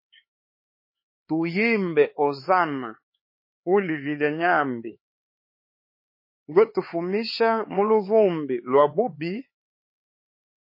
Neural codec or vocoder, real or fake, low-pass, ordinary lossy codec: codec, 16 kHz, 4 kbps, X-Codec, HuBERT features, trained on LibriSpeech; fake; 5.4 kHz; MP3, 24 kbps